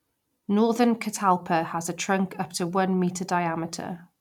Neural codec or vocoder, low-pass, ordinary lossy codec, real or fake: vocoder, 44.1 kHz, 128 mel bands every 256 samples, BigVGAN v2; 19.8 kHz; none; fake